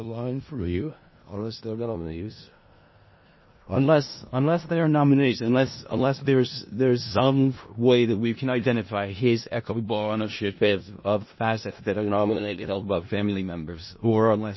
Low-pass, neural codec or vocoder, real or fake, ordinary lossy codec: 7.2 kHz; codec, 16 kHz in and 24 kHz out, 0.4 kbps, LongCat-Audio-Codec, four codebook decoder; fake; MP3, 24 kbps